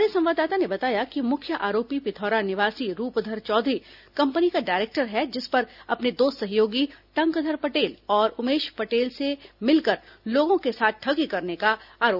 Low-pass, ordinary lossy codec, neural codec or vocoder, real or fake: 5.4 kHz; none; none; real